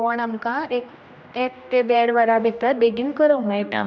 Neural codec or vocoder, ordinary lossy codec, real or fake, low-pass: codec, 16 kHz, 1 kbps, X-Codec, HuBERT features, trained on general audio; none; fake; none